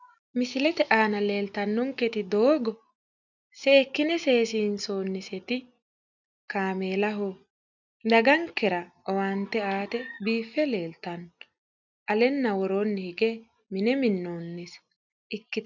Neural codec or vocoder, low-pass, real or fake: none; 7.2 kHz; real